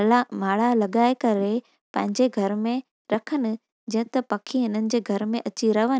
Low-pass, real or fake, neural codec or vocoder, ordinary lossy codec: none; real; none; none